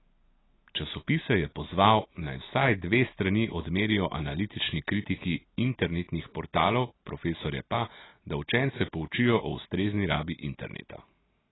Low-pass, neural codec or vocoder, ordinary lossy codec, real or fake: 7.2 kHz; none; AAC, 16 kbps; real